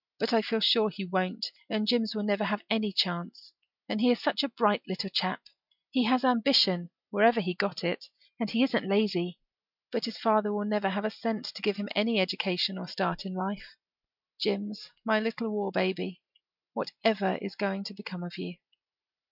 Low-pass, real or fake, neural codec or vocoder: 5.4 kHz; real; none